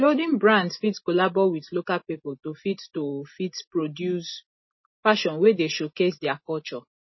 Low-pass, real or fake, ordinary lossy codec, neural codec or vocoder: 7.2 kHz; real; MP3, 24 kbps; none